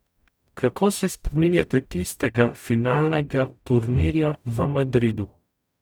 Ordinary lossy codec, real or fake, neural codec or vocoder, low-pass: none; fake; codec, 44.1 kHz, 0.9 kbps, DAC; none